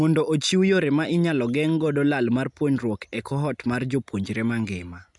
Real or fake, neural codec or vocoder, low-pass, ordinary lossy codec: real; none; 10.8 kHz; none